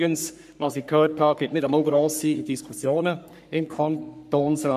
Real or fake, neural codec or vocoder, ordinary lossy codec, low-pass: fake; codec, 44.1 kHz, 3.4 kbps, Pupu-Codec; none; 14.4 kHz